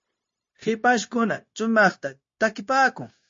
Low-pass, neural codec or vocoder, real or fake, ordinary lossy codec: 7.2 kHz; codec, 16 kHz, 0.9 kbps, LongCat-Audio-Codec; fake; MP3, 32 kbps